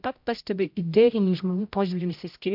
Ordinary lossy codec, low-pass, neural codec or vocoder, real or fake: MP3, 48 kbps; 5.4 kHz; codec, 16 kHz, 0.5 kbps, X-Codec, HuBERT features, trained on general audio; fake